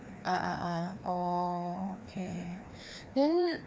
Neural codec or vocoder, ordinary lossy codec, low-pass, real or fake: codec, 16 kHz, 2 kbps, FreqCodec, larger model; none; none; fake